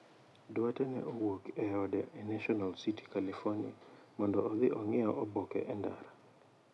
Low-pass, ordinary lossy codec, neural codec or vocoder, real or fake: none; none; none; real